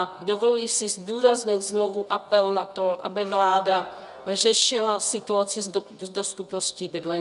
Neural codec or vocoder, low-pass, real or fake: codec, 24 kHz, 0.9 kbps, WavTokenizer, medium music audio release; 10.8 kHz; fake